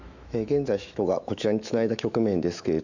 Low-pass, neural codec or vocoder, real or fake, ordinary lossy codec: 7.2 kHz; autoencoder, 48 kHz, 128 numbers a frame, DAC-VAE, trained on Japanese speech; fake; none